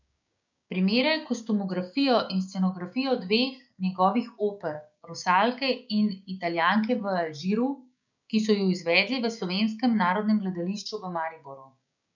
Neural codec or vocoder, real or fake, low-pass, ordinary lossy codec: codec, 16 kHz, 6 kbps, DAC; fake; 7.2 kHz; none